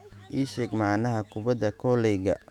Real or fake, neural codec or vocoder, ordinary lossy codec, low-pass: fake; autoencoder, 48 kHz, 128 numbers a frame, DAC-VAE, trained on Japanese speech; none; 19.8 kHz